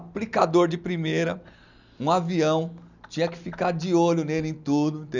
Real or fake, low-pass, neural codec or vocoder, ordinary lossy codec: real; 7.2 kHz; none; none